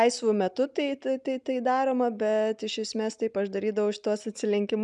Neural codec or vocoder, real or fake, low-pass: none; real; 10.8 kHz